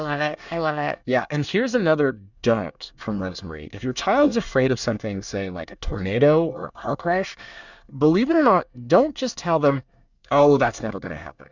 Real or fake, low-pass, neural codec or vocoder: fake; 7.2 kHz; codec, 24 kHz, 1 kbps, SNAC